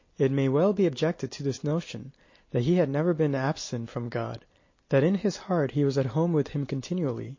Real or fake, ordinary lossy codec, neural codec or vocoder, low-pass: real; MP3, 32 kbps; none; 7.2 kHz